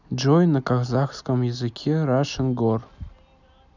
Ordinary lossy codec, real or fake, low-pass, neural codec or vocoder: none; real; 7.2 kHz; none